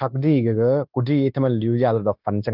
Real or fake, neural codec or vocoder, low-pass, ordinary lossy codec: fake; codec, 16 kHz, 0.9 kbps, LongCat-Audio-Codec; 5.4 kHz; Opus, 24 kbps